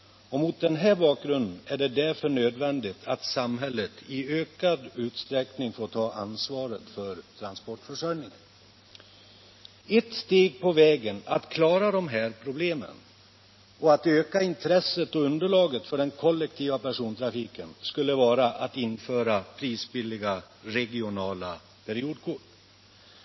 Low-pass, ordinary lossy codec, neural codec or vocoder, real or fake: 7.2 kHz; MP3, 24 kbps; none; real